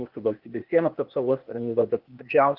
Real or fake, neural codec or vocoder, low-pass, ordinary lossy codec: fake; codec, 16 kHz, 0.8 kbps, ZipCodec; 5.4 kHz; Opus, 32 kbps